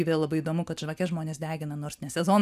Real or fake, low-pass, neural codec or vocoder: real; 14.4 kHz; none